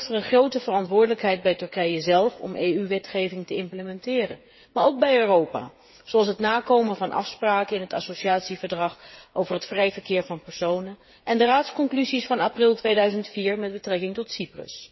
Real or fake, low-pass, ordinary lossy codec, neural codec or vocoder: fake; 7.2 kHz; MP3, 24 kbps; codec, 16 kHz, 8 kbps, FreqCodec, smaller model